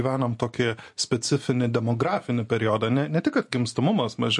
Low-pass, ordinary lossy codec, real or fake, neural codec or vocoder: 10.8 kHz; MP3, 48 kbps; real; none